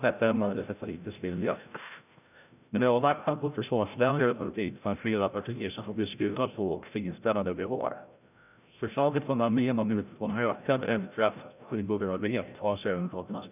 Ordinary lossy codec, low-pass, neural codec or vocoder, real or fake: none; 3.6 kHz; codec, 16 kHz, 0.5 kbps, FreqCodec, larger model; fake